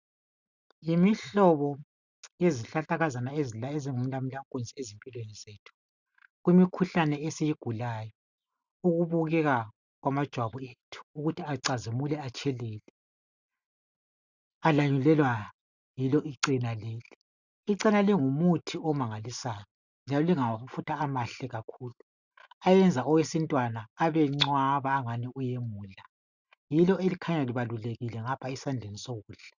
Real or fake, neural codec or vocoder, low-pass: real; none; 7.2 kHz